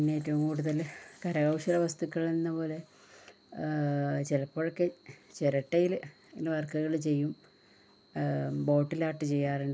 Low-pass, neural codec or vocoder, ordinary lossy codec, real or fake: none; none; none; real